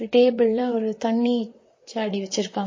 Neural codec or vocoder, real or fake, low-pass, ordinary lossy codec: vocoder, 44.1 kHz, 128 mel bands, Pupu-Vocoder; fake; 7.2 kHz; MP3, 32 kbps